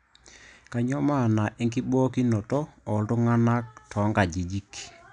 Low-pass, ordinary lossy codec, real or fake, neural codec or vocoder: 9.9 kHz; none; real; none